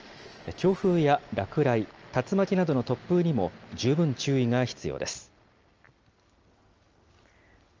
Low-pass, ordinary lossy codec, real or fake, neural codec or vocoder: 7.2 kHz; Opus, 24 kbps; real; none